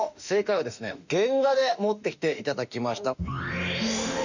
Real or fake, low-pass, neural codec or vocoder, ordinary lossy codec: fake; 7.2 kHz; autoencoder, 48 kHz, 32 numbers a frame, DAC-VAE, trained on Japanese speech; none